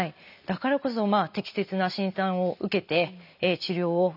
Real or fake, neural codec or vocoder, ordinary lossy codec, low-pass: real; none; none; 5.4 kHz